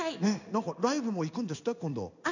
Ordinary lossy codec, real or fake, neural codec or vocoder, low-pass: none; fake; codec, 16 kHz in and 24 kHz out, 1 kbps, XY-Tokenizer; 7.2 kHz